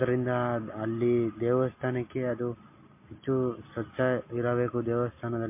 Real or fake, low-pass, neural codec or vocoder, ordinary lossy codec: real; 3.6 kHz; none; MP3, 24 kbps